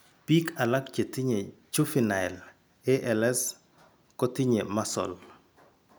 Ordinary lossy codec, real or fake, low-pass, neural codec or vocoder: none; real; none; none